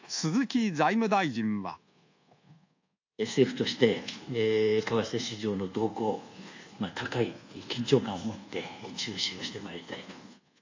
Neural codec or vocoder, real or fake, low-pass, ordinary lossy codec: codec, 24 kHz, 1.2 kbps, DualCodec; fake; 7.2 kHz; AAC, 48 kbps